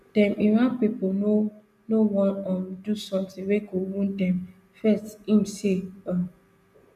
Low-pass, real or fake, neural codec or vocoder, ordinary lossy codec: 14.4 kHz; real; none; none